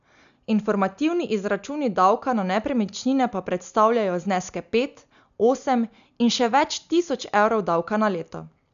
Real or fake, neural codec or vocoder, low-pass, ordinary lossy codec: real; none; 7.2 kHz; none